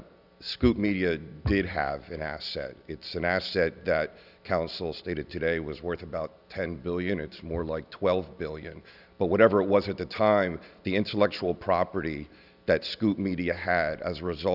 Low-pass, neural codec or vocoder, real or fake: 5.4 kHz; none; real